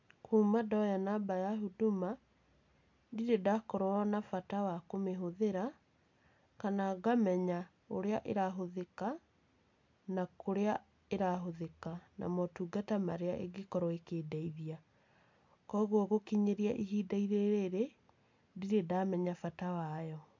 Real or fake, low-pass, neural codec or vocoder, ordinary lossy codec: real; 7.2 kHz; none; MP3, 64 kbps